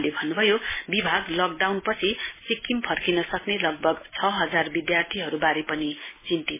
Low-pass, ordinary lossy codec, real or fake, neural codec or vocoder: 3.6 kHz; MP3, 16 kbps; real; none